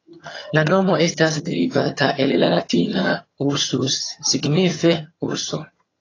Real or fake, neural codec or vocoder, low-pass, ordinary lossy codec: fake; vocoder, 22.05 kHz, 80 mel bands, HiFi-GAN; 7.2 kHz; AAC, 32 kbps